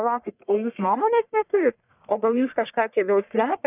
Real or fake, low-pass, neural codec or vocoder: fake; 3.6 kHz; codec, 44.1 kHz, 1.7 kbps, Pupu-Codec